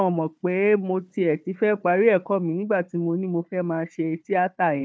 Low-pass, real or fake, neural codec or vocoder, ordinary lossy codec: none; fake; codec, 16 kHz, 4 kbps, FunCodec, trained on Chinese and English, 50 frames a second; none